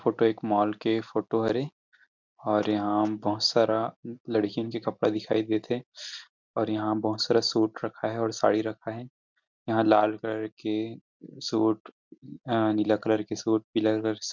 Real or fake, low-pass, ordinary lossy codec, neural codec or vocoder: real; 7.2 kHz; MP3, 64 kbps; none